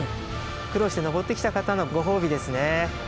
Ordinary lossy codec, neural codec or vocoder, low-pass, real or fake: none; none; none; real